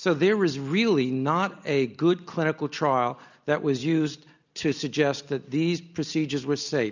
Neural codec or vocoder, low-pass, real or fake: none; 7.2 kHz; real